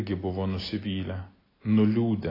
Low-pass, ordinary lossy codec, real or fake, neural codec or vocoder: 5.4 kHz; AAC, 24 kbps; real; none